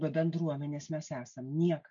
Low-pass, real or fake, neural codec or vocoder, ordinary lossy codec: 7.2 kHz; real; none; MP3, 64 kbps